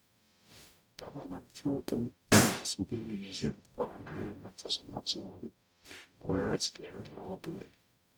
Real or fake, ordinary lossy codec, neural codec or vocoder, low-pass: fake; none; codec, 44.1 kHz, 0.9 kbps, DAC; none